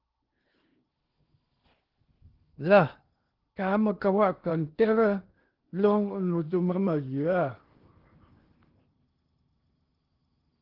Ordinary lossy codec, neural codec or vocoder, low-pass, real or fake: Opus, 16 kbps; codec, 16 kHz in and 24 kHz out, 0.8 kbps, FocalCodec, streaming, 65536 codes; 5.4 kHz; fake